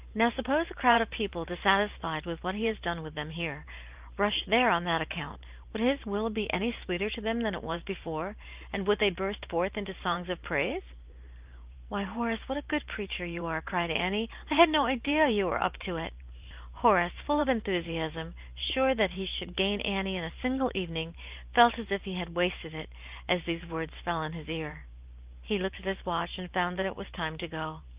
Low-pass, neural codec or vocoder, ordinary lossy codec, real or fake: 3.6 kHz; vocoder, 44.1 kHz, 128 mel bands every 512 samples, BigVGAN v2; Opus, 32 kbps; fake